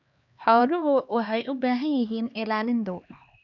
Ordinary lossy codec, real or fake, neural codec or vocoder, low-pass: none; fake; codec, 16 kHz, 2 kbps, X-Codec, HuBERT features, trained on LibriSpeech; 7.2 kHz